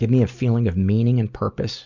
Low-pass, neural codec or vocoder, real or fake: 7.2 kHz; none; real